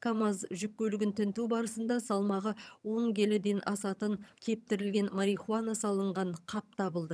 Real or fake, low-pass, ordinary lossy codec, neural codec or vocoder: fake; none; none; vocoder, 22.05 kHz, 80 mel bands, HiFi-GAN